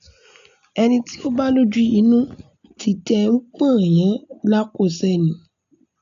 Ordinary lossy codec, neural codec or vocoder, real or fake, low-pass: AAC, 96 kbps; none; real; 7.2 kHz